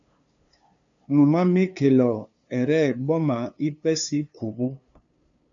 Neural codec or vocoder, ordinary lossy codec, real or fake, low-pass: codec, 16 kHz, 2 kbps, FunCodec, trained on LibriTTS, 25 frames a second; MP3, 64 kbps; fake; 7.2 kHz